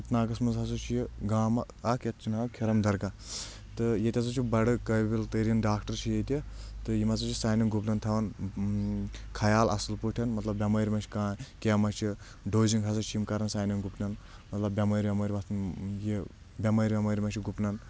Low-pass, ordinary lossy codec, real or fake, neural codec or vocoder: none; none; real; none